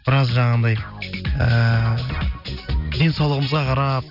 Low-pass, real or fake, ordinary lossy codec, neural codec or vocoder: 5.4 kHz; real; none; none